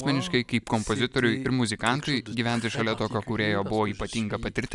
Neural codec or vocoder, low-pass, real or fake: none; 10.8 kHz; real